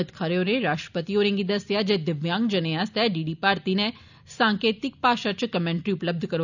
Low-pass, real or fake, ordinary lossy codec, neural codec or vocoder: 7.2 kHz; real; none; none